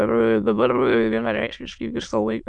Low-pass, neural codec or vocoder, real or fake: 9.9 kHz; autoencoder, 22.05 kHz, a latent of 192 numbers a frame, VITS, trained on many speakers; fake